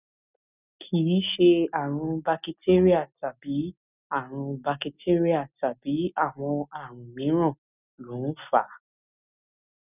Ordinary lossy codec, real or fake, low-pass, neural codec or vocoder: none; real; 3.6 kHz; none